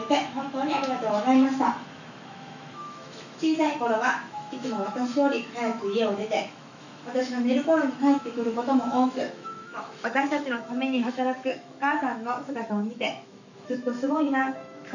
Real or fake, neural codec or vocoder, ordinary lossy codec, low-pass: fake; autoencoder, 48 kHz, 128 numbers a frame, DAC-VAE, trained on Japanese speech; none; 7.2 kHz